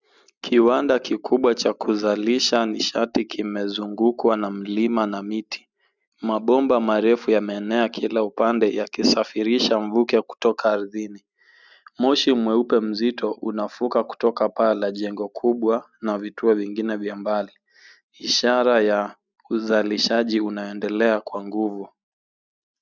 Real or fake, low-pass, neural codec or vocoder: real; 7.2 kHz; none